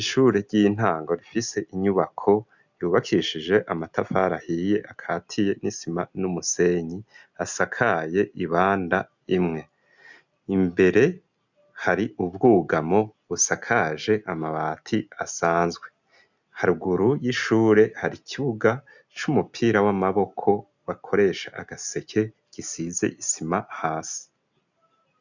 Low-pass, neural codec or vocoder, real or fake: 7.2 kHz; none; real